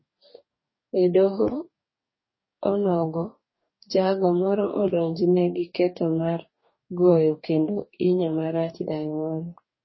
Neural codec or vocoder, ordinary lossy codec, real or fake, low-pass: codec, 44.1 kHz, 2.6 kbps, DAC; MP3, 24 kbps; fake; 7.2 kHz